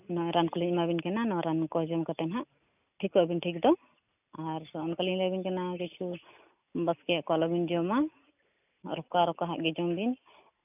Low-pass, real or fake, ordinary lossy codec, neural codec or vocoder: 3.6 kHz; real; none; none